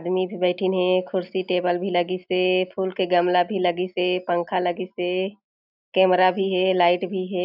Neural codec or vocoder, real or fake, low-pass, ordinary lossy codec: none; real; 5.4 kHz; none